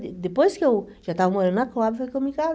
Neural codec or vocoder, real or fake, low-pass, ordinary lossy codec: none; real; none; none